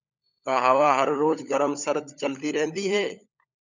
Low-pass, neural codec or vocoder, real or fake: 7.2 kHz; codec, 16 kHz, 16 kbps, FunCodec, trained on LibriTTS, 50 frames a second; fake